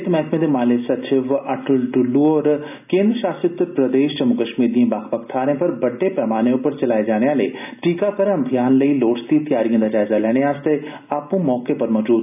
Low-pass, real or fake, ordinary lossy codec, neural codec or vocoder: 3.6 kHz; real; none; none